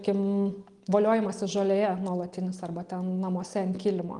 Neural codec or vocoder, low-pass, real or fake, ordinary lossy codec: none; 10.8 kHz; real; Opus, 24 kbps